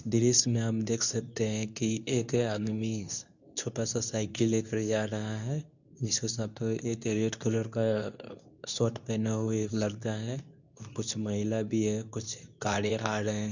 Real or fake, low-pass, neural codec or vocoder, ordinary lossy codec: fake; 7.2 kHz; codec, 24 kHz, 0.9 kbps, WavTokenizer, medium speech release version 2; none